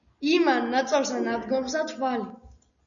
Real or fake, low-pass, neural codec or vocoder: real; 7.2 kHz; none